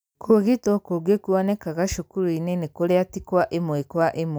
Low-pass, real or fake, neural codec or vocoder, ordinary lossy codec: none; real; none; none